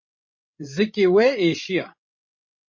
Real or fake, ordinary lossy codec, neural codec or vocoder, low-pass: fake; MP3, 32 kbps; codec, 16 kHz, 16 kbps, FreqCodec, larger model; 7.2 kHz